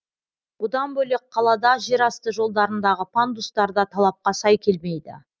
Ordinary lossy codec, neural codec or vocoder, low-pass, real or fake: none; none; none; real